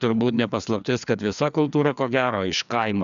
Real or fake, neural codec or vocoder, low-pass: fake; codec, 16 kHz, 2 kbps, FreqCodec, larger model; 7.2 kHz